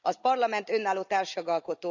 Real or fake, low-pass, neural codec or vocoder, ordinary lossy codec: real; 7.2 kHz; none; none